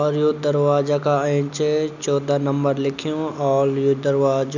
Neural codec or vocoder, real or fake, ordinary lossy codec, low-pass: none; real; none; 7.2 kHz